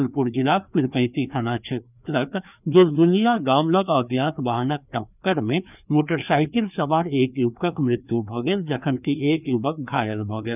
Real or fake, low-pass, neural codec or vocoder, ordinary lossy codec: fake; 3.6 kHz; codec, 16 kHz, 2 kbps, FreqCodec, larger model; none